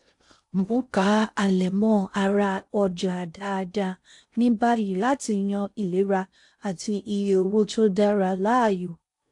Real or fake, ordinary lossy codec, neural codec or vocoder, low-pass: fake; AAC, 64 kbps; codec, 16 kHz in and 24 kHz out, 0.6 kbps, FocalCodec, streaming, 4096 codes; 10.8 kHz